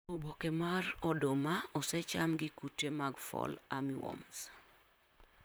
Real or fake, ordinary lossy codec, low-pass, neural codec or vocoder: fake; none; none; vocoder, 44.1 kHz, 128 mel bands, Pupu-Vocoder